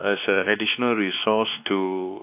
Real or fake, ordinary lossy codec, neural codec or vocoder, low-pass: fake; AAC, 32 kbps; codec, 16 kHz, 2 kbps, X-Codec, WavLM features, trained on Multilingual LibriSpeech; 3.6 kHz